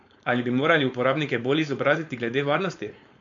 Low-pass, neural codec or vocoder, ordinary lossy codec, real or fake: 7.2 kHz; codec, 16 kHz, 4.8 kbps, FACodec; AAC, 48 kbps; fake